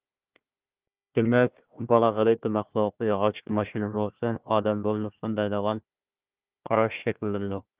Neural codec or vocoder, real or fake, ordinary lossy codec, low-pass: codec, 16 kHz, 1 kbps, FunCodec, trained on Chinese and English, 50 frames a second; fake; Opus, 32 kbps; 3.6 kHz